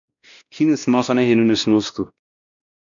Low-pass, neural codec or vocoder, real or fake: 7.2 kHz; codec, 16 kHz, 1 kbps, X-Codec, WavLM features, trained on Multilingual LibriSpeech; fake